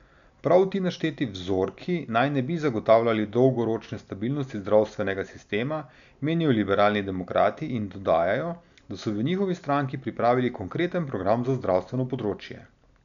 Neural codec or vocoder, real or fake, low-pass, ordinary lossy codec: none; real; 7.2 kHz; none